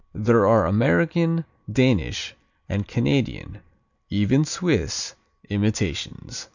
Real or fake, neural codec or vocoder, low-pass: real; none; 7.2 kHz